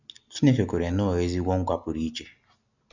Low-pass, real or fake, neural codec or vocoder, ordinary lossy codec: 7.2 kHz; real; none; none